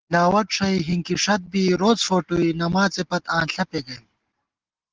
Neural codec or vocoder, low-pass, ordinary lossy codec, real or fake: none; 7.2 kHz; Opus, 16 kbps; real